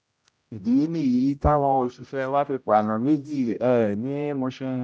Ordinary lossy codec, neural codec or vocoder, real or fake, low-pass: none; codec, 16 kHz, 0.5 kbps, X-Codec, HuBERT features, trained on general audio; fake; none